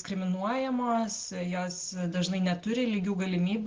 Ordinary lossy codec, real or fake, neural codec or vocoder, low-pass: Opus, 16 kbps; real; none; 7.2 kHz